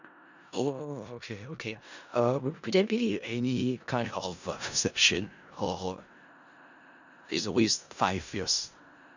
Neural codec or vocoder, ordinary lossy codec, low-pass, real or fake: codec, 16 kHz in and 24 kHz out, 0.4 kbps, LongCat-Audio-Codec, four codebook decoder; none; 7.2 kHz; fake